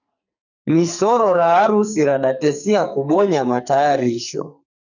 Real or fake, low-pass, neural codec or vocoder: fake; 7.2 kHz; codec, 44.1 kHz, 2.6 kbps, SNAC